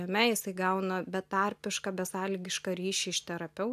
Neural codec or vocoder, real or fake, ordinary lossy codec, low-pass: none; real; AAC, 96 kbps; 14.4 kHz